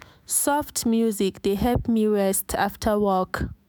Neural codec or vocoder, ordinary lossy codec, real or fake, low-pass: autoencoder, 48 kHz, 128 numbers a frame, DAC-VAE, trained on Japanese speech; none; fake; none